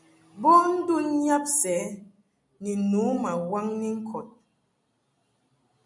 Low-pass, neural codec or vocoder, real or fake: 10.8 kHz; none; real